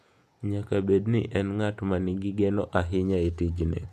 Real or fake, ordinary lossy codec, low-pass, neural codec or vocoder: fake; none; 14.4 kHz; vocoder, 48 kHz, 128 mel bands, Vocos